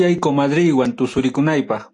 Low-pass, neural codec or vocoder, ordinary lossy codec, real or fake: 10.8 kHz; none; AAC, 48 kbps; real